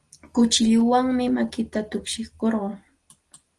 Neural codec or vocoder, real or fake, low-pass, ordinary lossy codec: none; real; 10.8 kHz; Opus, 24 kbps